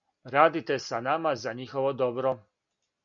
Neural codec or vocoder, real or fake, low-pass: none; real; 7.2 kHz